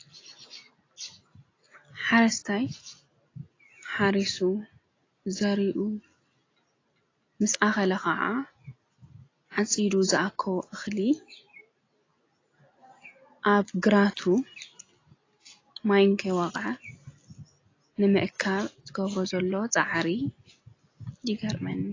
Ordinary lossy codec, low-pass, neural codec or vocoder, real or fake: AAC, 32 kbps; 7.2 kHz; none; real